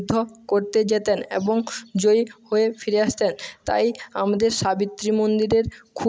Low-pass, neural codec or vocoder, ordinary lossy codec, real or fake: none; none; none; real